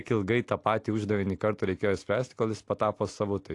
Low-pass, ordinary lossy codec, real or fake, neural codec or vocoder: 10.8 kHz; AAC, 48 kbps; real; none